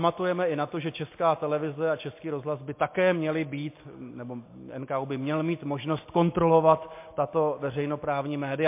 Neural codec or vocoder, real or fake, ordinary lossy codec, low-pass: none; real; MP3, 24 kbps; 3.6 kHz